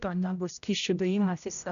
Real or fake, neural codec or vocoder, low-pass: fake; codec, 16 kHz, 0.5 kbps, X-Codec, HuBERT features, trained on general audio; 7.2 kHz